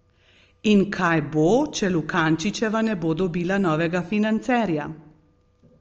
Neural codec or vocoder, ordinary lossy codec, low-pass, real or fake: none; Opus, 24 kbps; 7.2 kHz; real